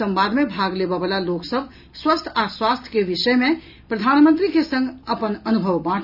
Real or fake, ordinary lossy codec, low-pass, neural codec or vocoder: real; none; 5.4 kHz; none